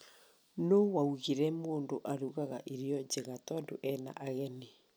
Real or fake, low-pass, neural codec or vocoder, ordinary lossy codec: real; none; none; none